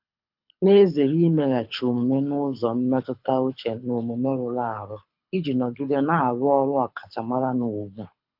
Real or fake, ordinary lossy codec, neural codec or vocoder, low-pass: fake; AAC, 48 kbps; codec, 24 kHz, 6 kbps, HILCodec; 5.4 kHz